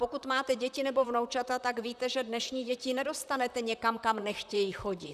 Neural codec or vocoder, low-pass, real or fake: vocoder, 44.1 kHz, 128 mel bands, Pupu-Vocoder; 14.4 kHz; fake